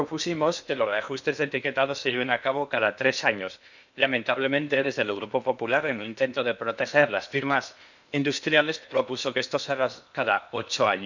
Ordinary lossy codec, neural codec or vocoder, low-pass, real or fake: none; codec, 16 kHz in and 24 kHz out, 0.8 kbps, FocalCodec, streaming, 65536 codes; 7.2 kHz; fake